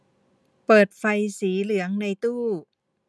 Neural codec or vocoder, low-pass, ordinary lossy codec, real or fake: none; none; none; real